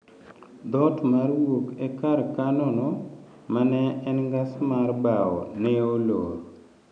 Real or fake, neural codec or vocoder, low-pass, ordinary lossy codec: real; none; 9.9 kHz; none